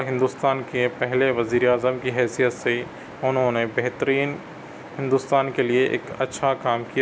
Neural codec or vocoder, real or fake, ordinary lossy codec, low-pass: none; real; none; none